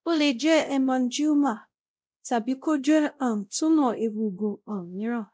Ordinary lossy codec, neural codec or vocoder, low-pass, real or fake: none; codec, 16 kHz, 0.5 kbps, X-Codec, WavLM features, trained on Multilingual LibriSpeech; none; fake